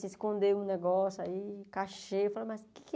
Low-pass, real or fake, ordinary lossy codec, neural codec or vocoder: none; real; none; none